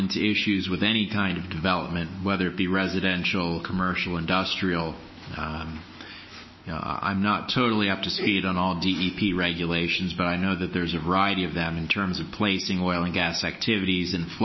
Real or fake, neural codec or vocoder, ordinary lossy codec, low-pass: fake; autoencoder, 48 kHz, 128 numbers a frame, DAC-VAE, trained on Japanese speech; MP3, 24 kbps; 7.2 kHz